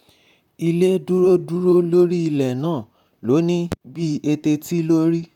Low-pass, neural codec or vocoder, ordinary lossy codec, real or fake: 19.8 kHz; vocoder, 44.1 kHz, 128 mel bands, Pupu-Vocoder; none; fake